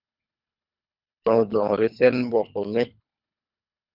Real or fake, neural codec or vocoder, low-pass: fake; codec, 24 kHz, 3 kbps, HILCodec; 5.4 kHz